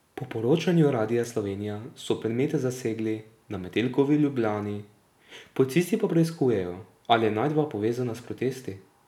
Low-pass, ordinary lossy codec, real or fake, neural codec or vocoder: 19.8 kHz; none; real; none